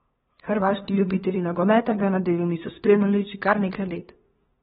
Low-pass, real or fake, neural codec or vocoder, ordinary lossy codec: 7.2 kHz; fake; codec, 16 kHz, 2 kbps, FunCodec, trained on LibriTTS, 25 frames a second; AAC, 16 kbps